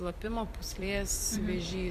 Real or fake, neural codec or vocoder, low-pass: fake; vocoder, 44.1 kHz, 128 mel bands every 256 samples, BigVGAN v2; 14.4 kHz